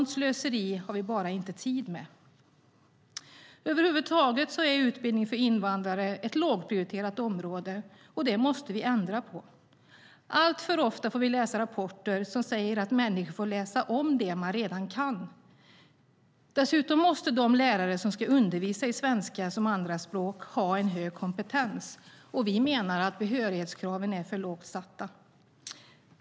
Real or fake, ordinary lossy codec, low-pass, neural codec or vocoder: real; none; none; none